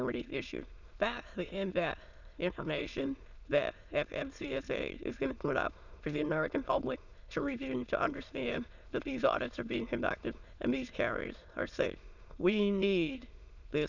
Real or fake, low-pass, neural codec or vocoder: fake; 7.2 kHz; autoencoder, 22.05 kHz, a latent of 192 numbers a frame, VITS, trained on many speakers